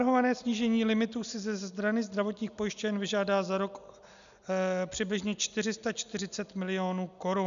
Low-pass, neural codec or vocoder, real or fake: 7.2 kHz; none; real